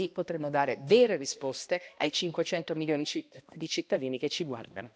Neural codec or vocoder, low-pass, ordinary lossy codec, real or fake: codec, 16 kHz, 1 kbps, X-Codec, HuBERT features, trained on balanced general audio; none; none; fake